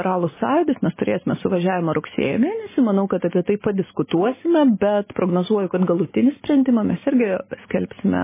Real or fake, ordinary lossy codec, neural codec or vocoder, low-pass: real; MP3, 16 kbps; none; 3.6 kHz